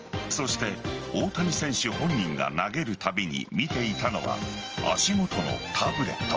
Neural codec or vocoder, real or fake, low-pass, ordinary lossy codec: none; real; 7.2 kHz; Opus, 24 kbps